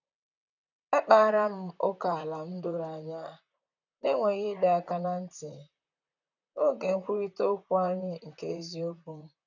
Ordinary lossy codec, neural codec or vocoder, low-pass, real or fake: none; vocoder, 44.1 kHz, 128 mel bands, Pupu-Vocoder; 7.2 kHz; fake